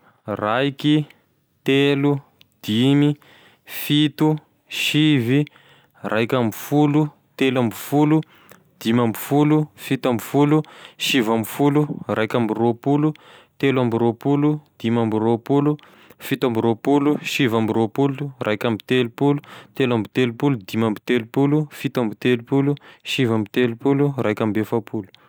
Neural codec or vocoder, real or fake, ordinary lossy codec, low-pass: none; real; none; none